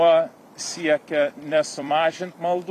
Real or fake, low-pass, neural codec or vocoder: fake; 14.4 kHz; vocoder, 44.1 kHz, 128 mel bands every 256 samples, BigVGAN v2